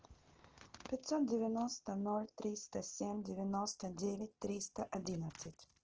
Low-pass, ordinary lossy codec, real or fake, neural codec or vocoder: 7.2 kHz; Opus, 16 kbps; real; none